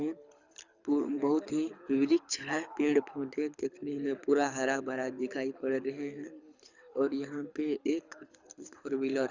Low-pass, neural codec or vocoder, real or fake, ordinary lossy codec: 7.2 kHz; codec, 24 kHz, 6 kbps, HILCodec; fake; Opus, 64 kbps